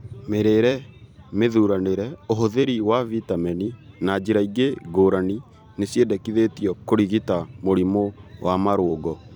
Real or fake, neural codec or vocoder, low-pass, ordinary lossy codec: fake; vocoder, 44.1 kHz, 128 mel bands every 256 samples, BigVGAN v2; 19.8 kHz; none